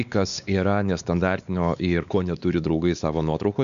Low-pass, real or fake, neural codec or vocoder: 7.2 kHz; fake; codec, 16 kHz, 4 kbps, X-Codec, WavLM features, trained on Multilingual LibriSpeech